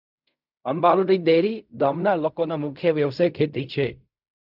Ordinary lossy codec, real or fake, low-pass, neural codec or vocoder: none; fake; 5.4 kHz; codec, 16 kHz in and 24 kHz out, 0.4 kbps, LongCat-Audio-Codec, fine tuned four codebook decoder